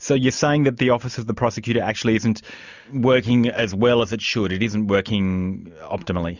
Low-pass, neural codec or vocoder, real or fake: 7.2 kHz; none; real